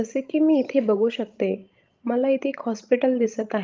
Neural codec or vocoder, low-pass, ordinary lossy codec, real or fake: codec, 16 kHz, 16 kbps, FreqCodec, larger model; 7.2 kHz; Opus, 32 kbps; fake